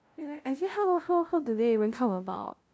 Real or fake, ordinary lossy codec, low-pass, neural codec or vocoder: fake; none; none; codec, 16 kHz, 0.5 kbps, FunCodec, trained on LibriTTS, 25 frames a second